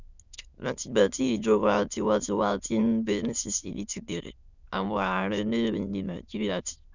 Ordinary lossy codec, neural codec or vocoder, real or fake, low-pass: none; autoencoder, 22.05 kHz, a latent of 192 numbers a frame, VITS, trained on many speakers; fake; 7.2 kHz